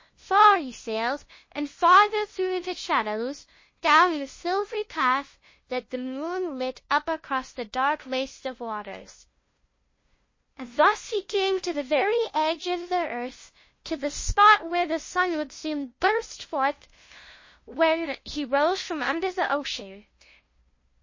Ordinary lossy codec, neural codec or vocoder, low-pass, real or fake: MP3, 32 kbps; codec, 16 kHz, 0.5 kbps, FunCodec, trained on LibriTTS, 25 frames a second; 7.2 kHz; fake